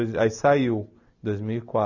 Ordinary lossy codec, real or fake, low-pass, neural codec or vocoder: none; real; 7.2 kHz; none